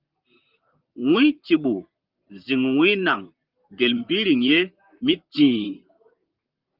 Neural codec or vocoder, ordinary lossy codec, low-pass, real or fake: vocoder, 44.1 kHz, 128 mel bands, Pupu-Vocoder; Opus, 24 kbps; 5.4 kHz; fake